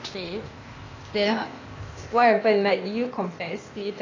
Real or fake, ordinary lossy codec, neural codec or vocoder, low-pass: fake; AAC, 32 kbps; codec, 16 kHz, 0.8 kbps, ZipCodec; 7.2 kHz